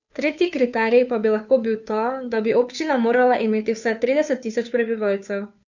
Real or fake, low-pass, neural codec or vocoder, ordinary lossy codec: fake; 7.2 kHz; codec, 16 kHz, 2 kbps, FunCodec, trained on Chinese and English, 25 frames a second; none